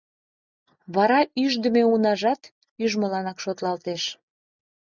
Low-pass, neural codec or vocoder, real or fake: 7.2 kHz; none; real